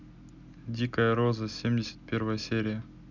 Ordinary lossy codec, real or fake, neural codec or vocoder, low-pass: none; real; none; 7.2 kHz